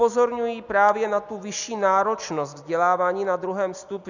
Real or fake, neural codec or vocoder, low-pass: real; none; 7.2 kHz